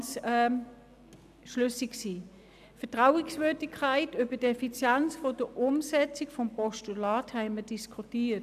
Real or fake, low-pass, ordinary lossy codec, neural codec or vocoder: real; 14.4 kHz; none; none